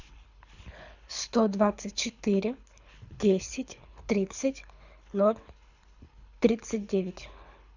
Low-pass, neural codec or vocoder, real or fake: 7.2 kHz; codec, 24 kHz, 6 kbps, HILCodec; fake